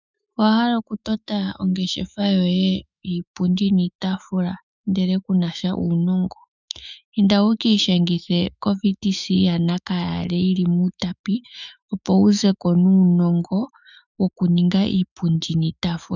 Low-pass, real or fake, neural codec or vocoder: 7.2 kHz; fake; autoencoder, 48 kHz, 128 numbers a frame, DAC-VAE, trained on Japanese speech